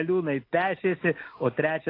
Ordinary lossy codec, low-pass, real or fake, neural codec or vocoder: AAC, 32 kbps; 5.4 kHz; real; none